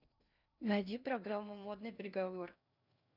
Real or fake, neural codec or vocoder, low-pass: fake; codec, 16 kHz in and 24 kHz out, 0.6 kbps, FocalCodec, streaming, 2048 codes; 5.4 kHz